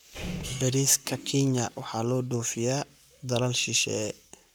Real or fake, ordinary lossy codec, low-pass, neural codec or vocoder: fake; none; none; codec, 44.1 kHz, 7.8 kbps, Pupu-Codec